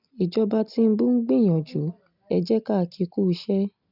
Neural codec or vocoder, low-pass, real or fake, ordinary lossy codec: none; 5.4 kHz; real; none